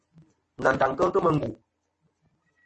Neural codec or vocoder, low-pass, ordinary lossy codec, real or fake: none; 10.8 kHz; MP3, 32 kbps; real